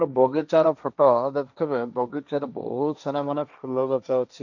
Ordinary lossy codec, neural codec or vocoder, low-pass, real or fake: none; codec, 16 kHz, 1.1 kbps, Voila-Tokenizer; 7.2 kHz; fake